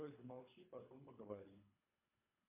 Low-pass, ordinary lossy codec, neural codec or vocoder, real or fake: 3.6 kHz; MP3, 32 kbps; codec, 24 kHz, 3 kbps, HILCodec; fake